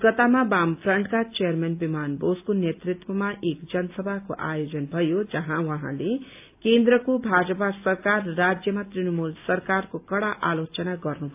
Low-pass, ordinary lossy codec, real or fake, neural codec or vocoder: 3.6 kHz; AAC, 32 kbps; real; none